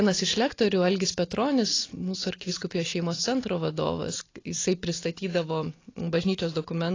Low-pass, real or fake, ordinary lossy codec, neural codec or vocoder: 7.2 kHz; real; AAC, 32 kbps; none